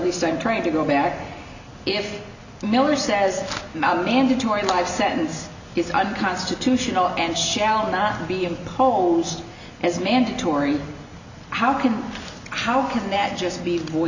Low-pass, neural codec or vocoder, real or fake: 7.2 kHz; none; real